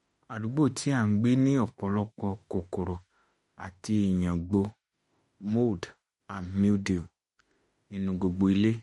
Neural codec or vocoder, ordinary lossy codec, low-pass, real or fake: autoencoder, 48 kHz, 32 numbers a frame, DAC-VAE, trained on Japanese speech; MP3, 48 kbps; 19.8 kHz; fake